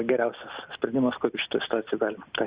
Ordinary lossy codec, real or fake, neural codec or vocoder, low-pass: Opus, 64 kbps; real; none; 3.6 kHz